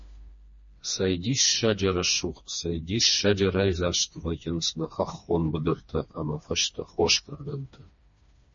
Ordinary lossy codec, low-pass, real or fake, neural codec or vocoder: MP3, 32 kbps; 7.2 kHz; fake; codec, 16 kHz, 2 kbps, FreqCodec, smaller model